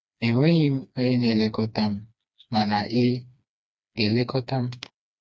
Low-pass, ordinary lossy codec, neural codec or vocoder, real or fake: none; none; codec, 16 kHz, 2 kbps, FreqCodec, smaller model; fake